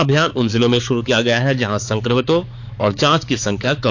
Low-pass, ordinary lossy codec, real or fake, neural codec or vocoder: 7.2 kHz; AAC, 48 kbps; fake; codec, 16 kHz, 4 kbps, X-Codec, HuBERT features, trained on balanced general audio